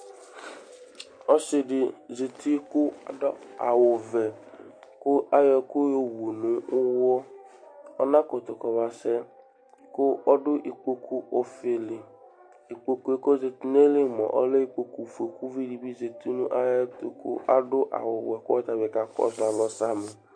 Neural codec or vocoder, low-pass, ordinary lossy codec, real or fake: none; 9.9 kHz; MP3, 48 kbps; real